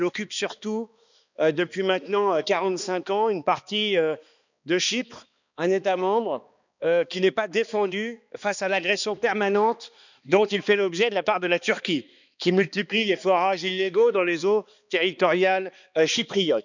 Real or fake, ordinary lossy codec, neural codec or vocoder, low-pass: fake; none; codec, 16 kHz, 2 kbps, X-Codec, HuBERT features, trained on balanced general audio; 7.2 kHz